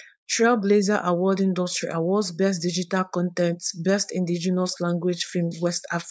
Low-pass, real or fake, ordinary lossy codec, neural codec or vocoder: none; fake; none; codec, 16 kHz, 4.8 kbps, FACodec